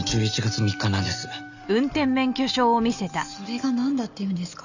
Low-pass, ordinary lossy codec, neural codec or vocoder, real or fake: 7.2 kHz; none; none; real